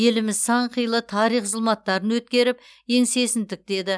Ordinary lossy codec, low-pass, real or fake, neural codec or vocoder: none; none; real; none